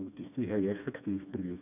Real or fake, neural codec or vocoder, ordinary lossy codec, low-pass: fake; codec, 16 kHz, 2 kbps, FreqCodec, smaller model; none; 3.6 kHz